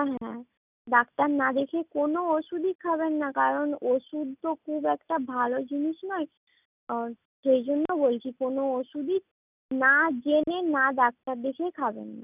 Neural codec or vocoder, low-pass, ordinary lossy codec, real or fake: none; 3.6 kHz; none; real